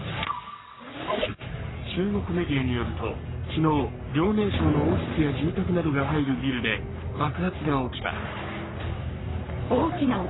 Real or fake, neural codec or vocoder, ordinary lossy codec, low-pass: fake; codec, 44.1 kHz, 3.4 kbps, Pupu-Codec; AAC, 16 kbps; 7.2 kHz